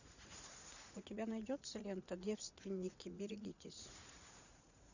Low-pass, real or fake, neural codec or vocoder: 7.2 kHz; fake; vocoder, 44.1 kHz, 80 mel bands, Vocos